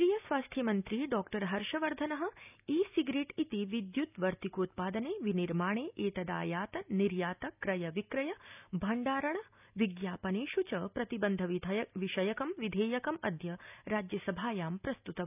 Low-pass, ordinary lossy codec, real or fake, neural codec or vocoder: 3.6 kHz; none; real; none